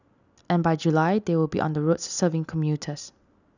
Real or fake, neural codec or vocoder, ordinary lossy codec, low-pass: real; none; none; 7.2 kHz